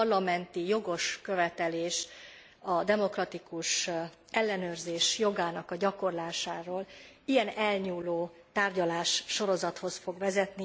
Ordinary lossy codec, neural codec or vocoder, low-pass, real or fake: none; none; none; real